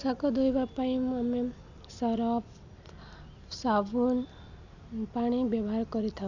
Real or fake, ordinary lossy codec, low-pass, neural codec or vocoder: real; none; 7.2 kHz; none